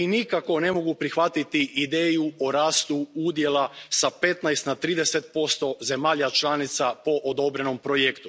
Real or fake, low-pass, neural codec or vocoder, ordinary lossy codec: real; none; none; none